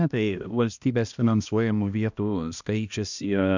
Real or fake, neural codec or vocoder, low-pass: fake; codec, 16 kHz, 1 kbps, X-Codec, HuBERT features, trained on balanced general audio; 7.2 kHz